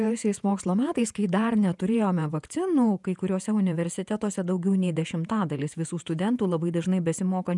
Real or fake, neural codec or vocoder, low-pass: fake; vocoder, 48 kHz, 128 mel bands, Vocos; 10.8 kHz